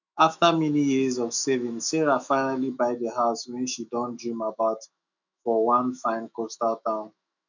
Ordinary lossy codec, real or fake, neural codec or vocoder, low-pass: none; fake; autoencoder, 48 kHz, 128 numbers a frame, DAC-VAE, trained on Japanese speech; 7.2 kHz